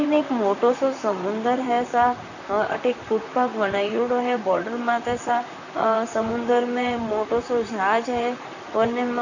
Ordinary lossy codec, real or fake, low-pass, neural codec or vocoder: none; fake; 7.2 kHz; vocoder, 44.1 kHz, 128 mel bands, Pupu-Vocoder